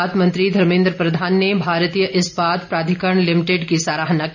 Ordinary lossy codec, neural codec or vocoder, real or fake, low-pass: none; none; real; 7.2 kHz